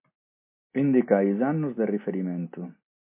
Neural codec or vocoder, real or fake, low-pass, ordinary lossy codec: none; real; 3.6 kHz; MP3, 24 kbps